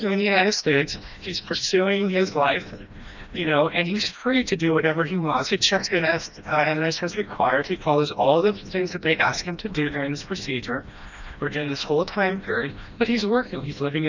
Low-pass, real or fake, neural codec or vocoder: 7.2 kHz; fake; codec, 16 kHz, 1 kbps, FreqCodec, smaller model